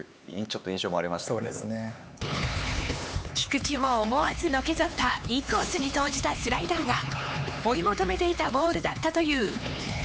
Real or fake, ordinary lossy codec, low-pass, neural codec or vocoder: fake; none; none; codec, 16 kHz, 4 kbps, X-Codec, HuBERT features, trained on LibriSpeech